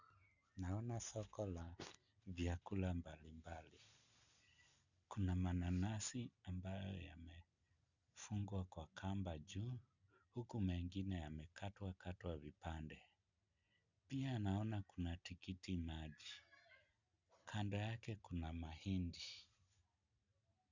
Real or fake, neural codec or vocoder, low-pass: real; none; 7.2 kHz